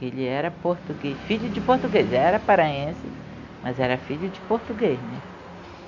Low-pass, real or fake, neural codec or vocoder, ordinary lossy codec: 7.2 kHz; real; none; none